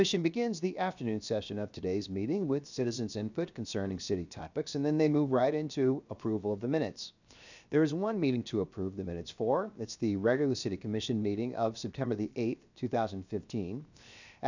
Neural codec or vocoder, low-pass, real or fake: codec, 16 kHz, 0.7 kbps, FocalCodec; 7.2 kHz; fake